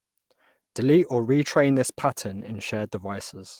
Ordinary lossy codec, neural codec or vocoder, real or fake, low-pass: Opus, 24 kbps; codec, 44.1 kHz, 7.8 kbps, DAC; fake; 19.8 kHz